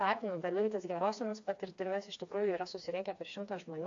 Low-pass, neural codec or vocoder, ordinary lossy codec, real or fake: 7.2 kHz; codec, 16 kHz, 2 kbps, FreqCodec, smaller model; AAC, 48 kbps; fake